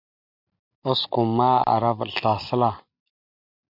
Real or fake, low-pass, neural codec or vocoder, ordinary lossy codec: real; 5.4 kHz; none; MP3, 48 kbps